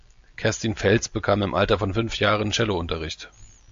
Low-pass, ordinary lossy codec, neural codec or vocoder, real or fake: 7.2 kHz; AAC, 64 kbps; none; real